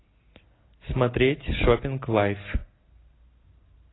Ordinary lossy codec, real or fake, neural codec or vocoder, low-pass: AAC, 16 kbps; real; none; 7.2 kHz